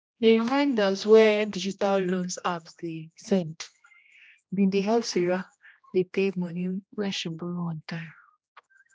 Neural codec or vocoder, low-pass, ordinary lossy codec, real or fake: codec, 16 kHz, 1 kbps, X-Codec, HuBERT features, trained on general audio; none; none; fake